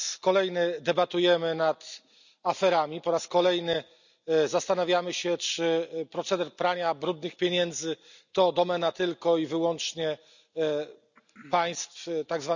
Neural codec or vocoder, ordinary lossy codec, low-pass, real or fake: none; none; 7.2 kHz; real